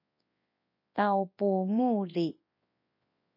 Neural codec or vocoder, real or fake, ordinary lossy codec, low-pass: codec, 24 kHz, 0.9 kbps, WavTokenizer, large speech release; fake; MP3, 32 kbps; 5.4 kHz